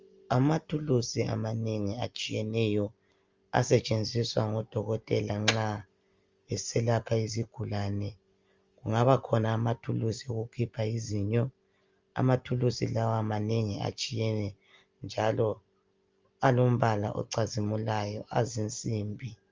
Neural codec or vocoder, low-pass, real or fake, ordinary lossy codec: none; 7.2 kHz; real; Opus, 32 kbps